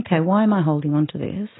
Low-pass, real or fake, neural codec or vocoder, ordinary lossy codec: 7.2 kHz; real; none; AAC, 16 kbps